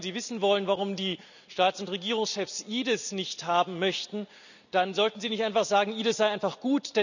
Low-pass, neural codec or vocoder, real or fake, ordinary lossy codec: 7.2 kHz; none; real; none